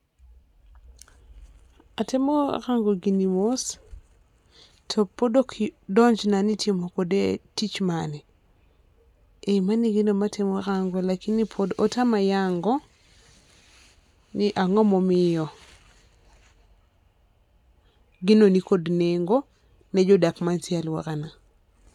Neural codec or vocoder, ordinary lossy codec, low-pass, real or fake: none; none; 19.8 kHz; real